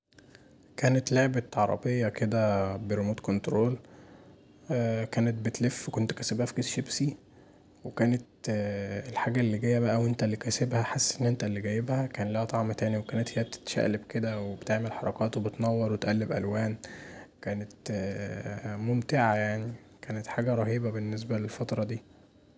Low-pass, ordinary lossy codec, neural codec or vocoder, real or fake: none; none; none; real